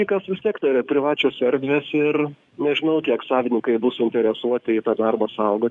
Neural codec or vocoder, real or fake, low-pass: codec, 44.1 kHz, 7.8 kbps, DAC; fake; 10.8 kHz